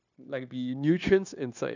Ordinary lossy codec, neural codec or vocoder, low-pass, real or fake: none; codec, 16 kHz, 0.9 kbps, LongCat-Audio-Codec; 7.2 kHz; fake